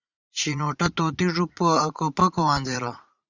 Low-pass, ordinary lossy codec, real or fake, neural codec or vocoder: 7.2 kHz; Opus, 64 kbps; fake; vocoder, 44.1 kHz, 80 mel bands, Vocos